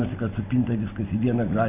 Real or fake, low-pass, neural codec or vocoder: real; 3.6 kHz; none